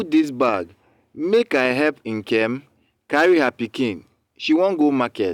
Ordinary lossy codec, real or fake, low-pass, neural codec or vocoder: none; real; 19.8 kHz; none